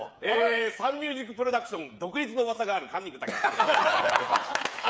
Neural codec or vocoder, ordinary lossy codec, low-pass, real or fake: codec, 16 kHz, 8 kbps, FreqCodec, smaller model; none; none; fake